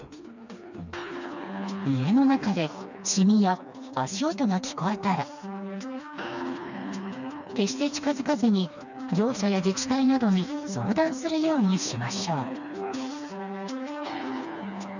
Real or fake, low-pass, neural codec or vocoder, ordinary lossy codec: fake; 7.2 kHz; codec, 16 kHz, 2 kbps, FreqCodec, smaller model; none